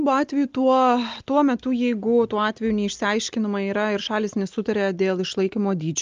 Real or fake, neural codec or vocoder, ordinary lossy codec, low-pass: real; none; Opus, 32 kbps; 7.2 kHz